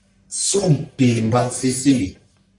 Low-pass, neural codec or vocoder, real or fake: 10.8 kHz; codec, 44.1 kHz, 1.7 kbps, Pupu-Codec; fake